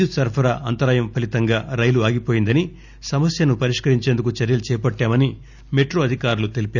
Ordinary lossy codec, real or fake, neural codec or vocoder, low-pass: none; real; none; 7.2 kHz